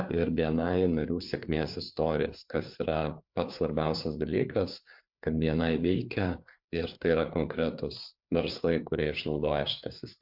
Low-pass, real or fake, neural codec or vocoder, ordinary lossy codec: 5.4 kHz; fake; codec, 16 kHz, 4 kbps, FreqCodec, larger model; MP3, 48 kbps